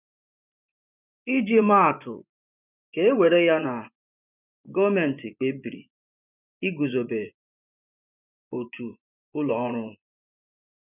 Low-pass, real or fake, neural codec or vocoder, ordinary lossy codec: 3.6 kHz; fake; vocoder, 24 kHz, 100 mel bands, Vocos; none